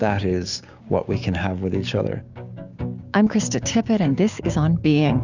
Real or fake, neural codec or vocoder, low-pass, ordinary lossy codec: fake; codec, 16 kHz, 6 kbps, DAC; 7.2 kHz; Opus, 64 kbps